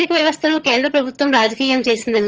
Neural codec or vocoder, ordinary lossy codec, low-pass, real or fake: vocoder, 22.05 kHz, 80 mel bands, HiFi-GAN; Opus, 24 kbps; 7.2 kHz; fake